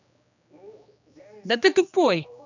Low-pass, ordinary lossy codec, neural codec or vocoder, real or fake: 7.2 kHz; none; codec, 16 kHz, 2 kbps, X-Codec, HuBERT features, trained on general audio; fake